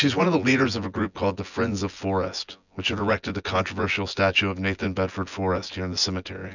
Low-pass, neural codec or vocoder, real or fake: 7.2 kHz; vocoder, 24 kHz, 100 mel bands, Vocos; fake